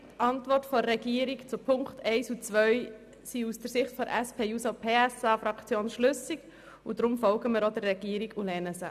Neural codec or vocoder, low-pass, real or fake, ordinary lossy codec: none; 14.4 kHz; real; none